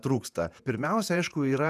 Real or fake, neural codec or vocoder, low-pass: fake; vocoder, 48 kHz, 128 mel bands, Vocos; 14.4 kHz